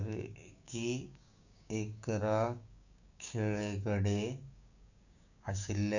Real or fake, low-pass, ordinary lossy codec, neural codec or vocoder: fake; 7.2 kHz; none; codec, 16 kHz, 6 kbps, DAC